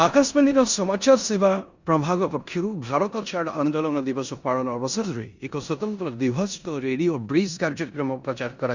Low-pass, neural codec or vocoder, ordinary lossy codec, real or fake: 7.2 kHz; codec, 16 kHz in and 24 kHz out, 0.9 kbps, LongCat-Audio-Codec, four codebook decoder; Opus, 64 kbps; fake